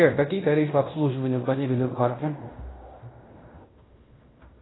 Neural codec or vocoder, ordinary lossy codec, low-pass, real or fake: codec, 16 kHz in and 24 kHz out, 0.9 kbps, LongCat-Audio-Codec, fine tuned four codebook decoder; AAC, 16 kbps; 7.2 kHz; fake